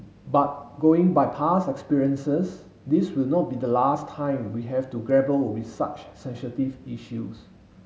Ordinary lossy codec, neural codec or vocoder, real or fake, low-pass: none; none; real; none